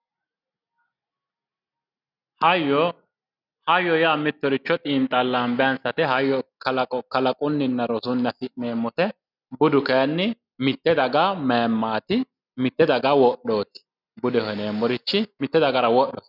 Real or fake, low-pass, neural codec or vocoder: real; 5.4 kHz; none